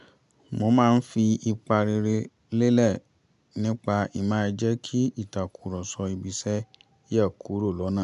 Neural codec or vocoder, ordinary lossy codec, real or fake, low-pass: none; none; real; 10.8 kHz